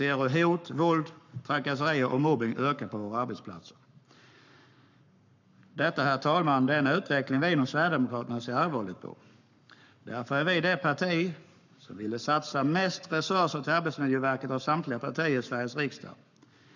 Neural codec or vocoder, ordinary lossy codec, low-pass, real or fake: codec, 44.1 kHz, 7.8 kbps, Pupu-Codec; none; 7.2 kHz; fake